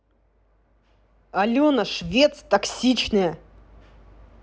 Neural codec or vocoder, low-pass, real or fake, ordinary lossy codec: none; none; real; none